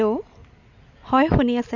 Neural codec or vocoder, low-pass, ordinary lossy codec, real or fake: none; 7.2 kHz; none; real